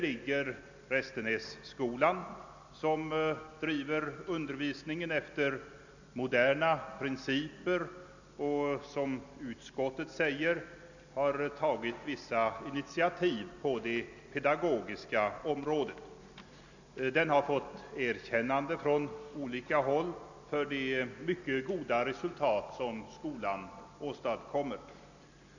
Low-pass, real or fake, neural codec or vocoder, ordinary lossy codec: 7.2 kHz; real; none; none